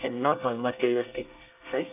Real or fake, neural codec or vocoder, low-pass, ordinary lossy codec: fake; codec, 24 kHz, 1 kbps, SNAC; 3.6 kHz; none